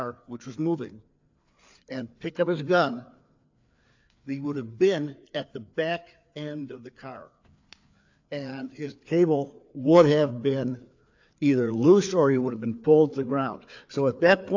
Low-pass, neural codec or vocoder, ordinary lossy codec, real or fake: 7.2 kHz; codec, 16 kHz, 4 kbps, FreqCodec, larger model; AAC, 48 kbps; fake